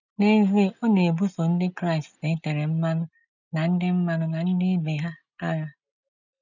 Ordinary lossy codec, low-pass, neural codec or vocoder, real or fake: none; 7.2 kHz; none; real